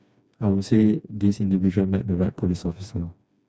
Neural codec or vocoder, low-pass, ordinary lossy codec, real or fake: codec, 16 kHz, 2 kbps, FreqCodec, smaller model; none; none; fake